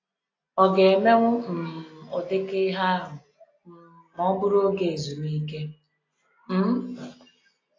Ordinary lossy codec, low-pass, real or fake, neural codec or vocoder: AAC, 32 kbps; 7.2 kHz; real; none